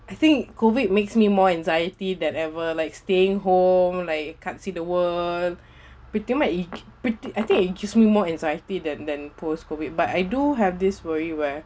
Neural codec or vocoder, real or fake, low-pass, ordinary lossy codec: none; real; none; none